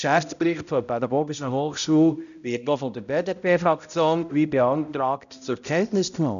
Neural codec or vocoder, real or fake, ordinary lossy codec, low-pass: codec, 16 kHz, 0.5 kbps, X-Codec, HuBERT features, trained on balanced general audio; fake; none; 7.2 kHz